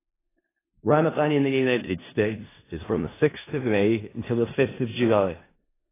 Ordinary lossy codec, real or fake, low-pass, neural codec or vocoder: AAC, 16 kbps; fake; 3.6 kHz; codec, 16 kHz in and 24 kHz out, 0.4 kbps, LongCat-Audio-Codec, four codebook decoder